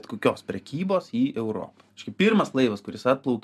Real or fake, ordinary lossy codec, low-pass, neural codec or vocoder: real; MP3, 96 kbps; 14.4 kHz; none